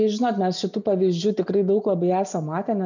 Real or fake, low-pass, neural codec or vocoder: real; 7.2 kHz; none